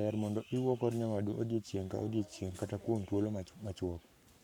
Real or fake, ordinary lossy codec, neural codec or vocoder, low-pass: fake; none; codec, 44.1 kHz, 7.8 kbps, Pupu-Codec; 19.8 kHz